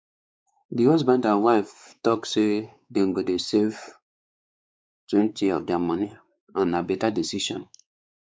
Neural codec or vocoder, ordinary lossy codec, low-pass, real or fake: codec, 16 kHz, 4 kbps, X-Codec, WavLM features, trained on Multilingual LibriSpeech; none; none; fake